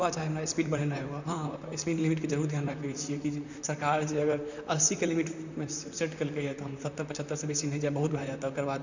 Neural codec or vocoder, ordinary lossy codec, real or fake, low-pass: vocoder, 44.1 kHz, 128 mel bands, Pupu-Vocoder; none; fake; 7.2 kHz